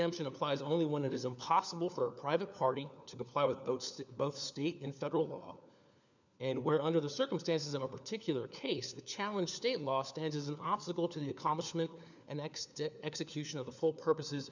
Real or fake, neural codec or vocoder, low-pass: fake; codec, 16 kHz, 4 kbps, FunCodec, trained on LibriTTS, 50 frames a second; 7.2 kHz